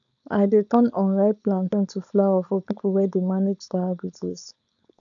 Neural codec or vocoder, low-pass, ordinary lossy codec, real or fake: codec, 16 kHz, 4.8 kbps, FACodec; 7.2 kHz; none; fake